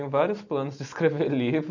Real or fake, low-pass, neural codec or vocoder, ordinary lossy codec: real; 7.2 kHz; none; none